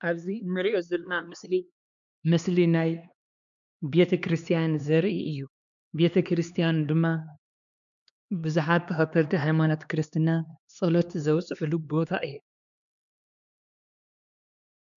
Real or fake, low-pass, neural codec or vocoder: fake; 7.2 kHz; codec, 16 kHz, 2 kbps, X-Codec, HuBERT features, trained on LibriSpeech